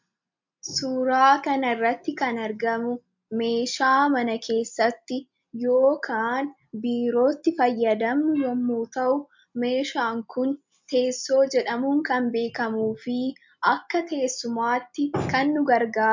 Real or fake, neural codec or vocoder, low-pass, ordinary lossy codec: real; none; 7.2 kHz; MP3, 64 kbps